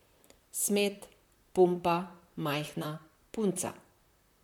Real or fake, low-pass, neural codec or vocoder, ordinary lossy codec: fake; 19.8 kHz; vocoder, 44.1 kHz, 128 mel bands, Pupu-Vocoder; MP3, 96 kbps